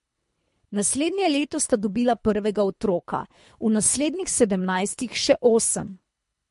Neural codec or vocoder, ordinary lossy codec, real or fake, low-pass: codec, 24 kHz, 3 kbps, HILCodec; MP3, 48 kbps; fake; 10.8 kHz